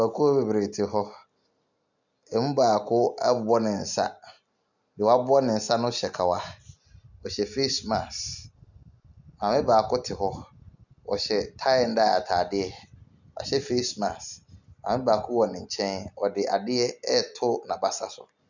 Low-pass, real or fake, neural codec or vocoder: 7.2 kHz; real; none